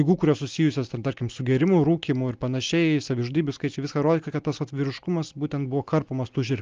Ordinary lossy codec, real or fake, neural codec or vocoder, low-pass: Opus, 32 kbps; real; none; 7.2 kHz